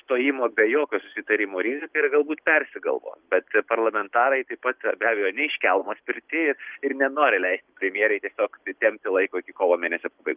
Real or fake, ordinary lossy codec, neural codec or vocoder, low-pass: real; Opus, 32 kbps; none; 3.6 kHz